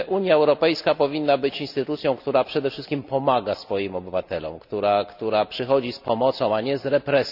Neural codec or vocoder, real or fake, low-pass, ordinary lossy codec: none; real; 5.4 kHz; none